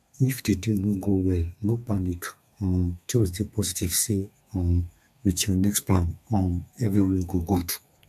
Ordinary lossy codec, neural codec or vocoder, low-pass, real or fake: none; codec, 32 kHz, 1.9 kbps, SNAC; 14.4 kHz; fake